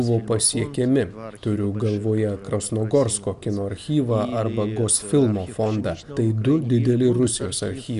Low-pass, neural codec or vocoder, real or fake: 10.8 kHz; none; real